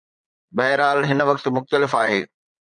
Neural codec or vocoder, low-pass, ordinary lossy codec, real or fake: vocoder, 22.05 kHz, 80 mel bands, Vocos; 9.9 kHz; MP3, 96 kbps; fake